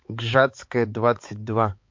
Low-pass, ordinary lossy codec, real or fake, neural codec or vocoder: 7.2 kHz; MP3, 48 kbps; fake; codec, 16 kHz, 4 kbps, X-Codec, HuBERT features, trained on balanced general audio